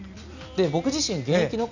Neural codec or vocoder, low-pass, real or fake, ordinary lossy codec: none; 7.2 kHz; real; none